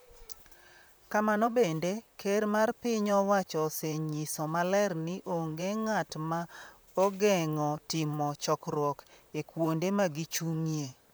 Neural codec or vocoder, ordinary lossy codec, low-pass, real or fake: vocoder, 44.1 kHz, 128 mel bands, Pupu-Vocoder; none; none; fake